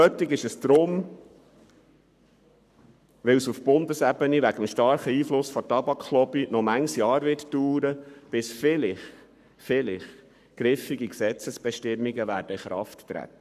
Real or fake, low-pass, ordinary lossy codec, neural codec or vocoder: fake; 14.4 kHz; none; codec, 44.1 kHz, 7.8 kbps, Pupu-Codec